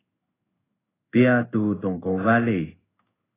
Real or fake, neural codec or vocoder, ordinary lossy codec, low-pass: fake; codec, 16 kHz in and 24 kHz out, 1 kbps, XY-Tokenizer; AAC, 16 kbps; 3.6 kHz